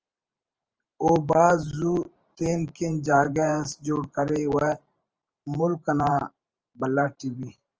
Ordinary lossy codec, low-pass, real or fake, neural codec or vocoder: Opus, 24 kbps; 7.2 kHz; fake; vocoder, 44.1 kHz, 128 mel bands every 512 samples, BigVGAN v2